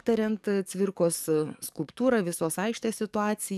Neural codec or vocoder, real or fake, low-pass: codec, 44.1 kHz, 7.8 kbps, DAC; fake; 14.4 kHz